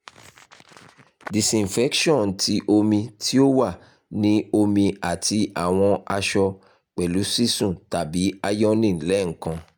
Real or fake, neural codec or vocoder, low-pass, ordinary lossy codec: real; none; none; none